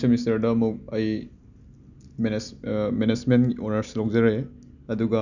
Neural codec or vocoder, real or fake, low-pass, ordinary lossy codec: none; real; 7.2 kHz; none